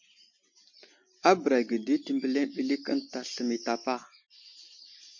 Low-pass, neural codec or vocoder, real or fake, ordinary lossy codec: 7.2 kHz; none; real; MP3, 48 kbps